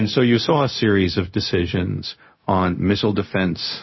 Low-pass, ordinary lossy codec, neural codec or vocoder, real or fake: 7.2 kHz; MP3, 24 kbps; codec, 16 kHz, 0.4 kbps, LongCat-Audio-Codec; fake